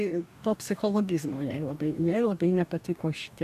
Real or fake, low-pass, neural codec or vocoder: fake; 14.4 kHz; codec, 44.1 kHz, 2.6 kbps, DAC